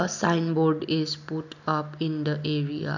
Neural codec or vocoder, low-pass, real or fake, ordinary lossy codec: none; 7.2 kHz; real; none